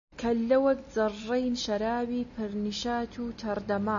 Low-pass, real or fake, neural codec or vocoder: 7.2 kHz; real; none